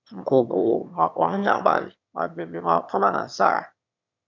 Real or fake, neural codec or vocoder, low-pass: fake; autoencoder, 22.05 kHz, a latent of 192 numbers a frame, VITS, trained on one speaker; 7.2 kHz